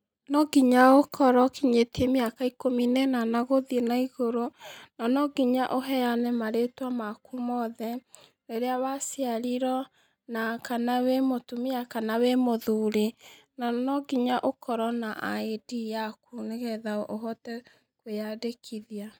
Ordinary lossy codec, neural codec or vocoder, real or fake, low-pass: none; none; real; none